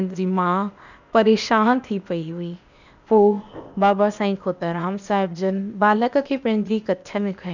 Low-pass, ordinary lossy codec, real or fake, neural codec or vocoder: 7.2 kHz; none; fake; codec, 16 kHz, 0.8 kbps, ZipCodec